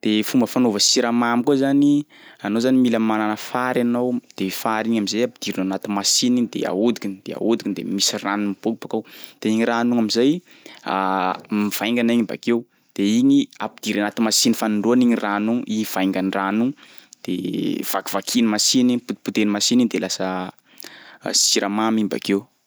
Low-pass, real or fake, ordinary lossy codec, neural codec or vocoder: none; real; none; none